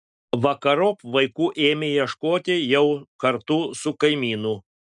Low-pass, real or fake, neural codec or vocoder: 10.8 kHz; real; none